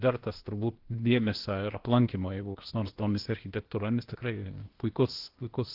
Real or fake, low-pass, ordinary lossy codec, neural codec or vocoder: fake; 5.4 kHz; Opus, 16 kbps; codec, 16 kHz, 0.8 kbps, ZipCodec